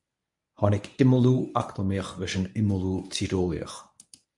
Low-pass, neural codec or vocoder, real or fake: 10.8 kHz; codec, 24 kHz, 0.9 kbps, WavTokenizer, medium speech release version 1; fake